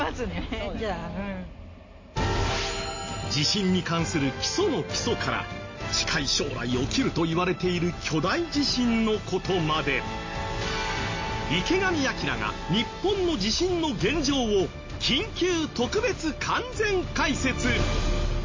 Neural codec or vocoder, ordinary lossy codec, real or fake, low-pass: none; MP3, 32 kbps; real; 7.2 kHz